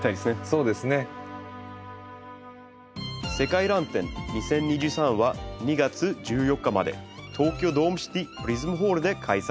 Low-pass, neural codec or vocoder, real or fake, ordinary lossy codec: none; none; real; none